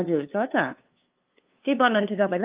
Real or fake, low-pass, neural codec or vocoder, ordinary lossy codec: fake; 3.6 kHz; codec, 16 kHz, 2 kbps, FunCodec, trained on LibriTTS, 25 frames a second; Opus, 24 kbps